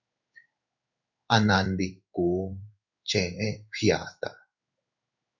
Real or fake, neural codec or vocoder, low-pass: fake; codec, 16 kHz in and 24 kHz out, 1 kbps, XY-Tokenizer; 7.2 kHz